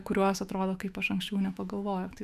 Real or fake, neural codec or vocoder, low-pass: fake; autoencoder, 48 kHz, 128 numbers a frame, DAC-VAE, trained on Japanese speech; 14.4 kHz